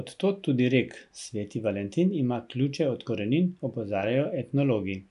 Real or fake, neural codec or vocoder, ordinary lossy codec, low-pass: real; none; none; 10.8 kHz